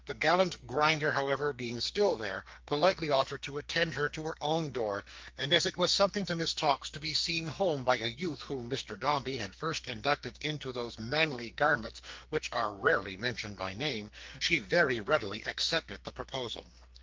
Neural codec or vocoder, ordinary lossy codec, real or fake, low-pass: codec, 44.1 kHz, 2.6 kbps, SNAC; Opus, 32 kbps; fake; 7.2 kHz